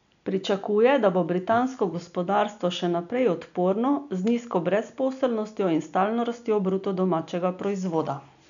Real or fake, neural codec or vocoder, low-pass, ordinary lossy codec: real; none; 7.2 kHz; none